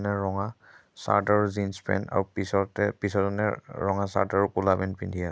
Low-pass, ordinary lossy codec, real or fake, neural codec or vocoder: none; none; real; none